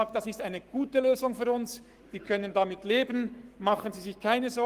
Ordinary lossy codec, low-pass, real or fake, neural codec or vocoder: Opus, 24 kbps; 14.4 kHz; fake; codec, 44.1 kHz, 7.8 kbps, Pupu-Codec